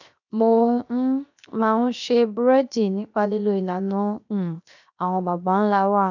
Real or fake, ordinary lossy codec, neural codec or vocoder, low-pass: fake; none; codec, 16 kHz, 0.7 kbps, FocalCodec; 7.2 kHz